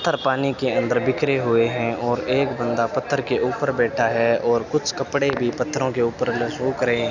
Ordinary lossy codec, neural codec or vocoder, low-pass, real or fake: none; none; 7.2 kHz; real